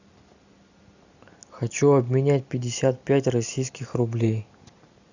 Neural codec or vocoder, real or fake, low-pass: none; real; 7.2 kHz